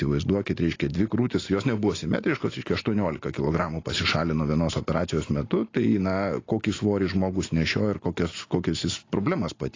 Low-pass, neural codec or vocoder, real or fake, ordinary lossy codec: 7.2 kHz; none; real; AAC, 32 kbps